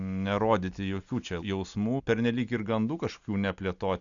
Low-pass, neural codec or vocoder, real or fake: 7.2 kHz; none; real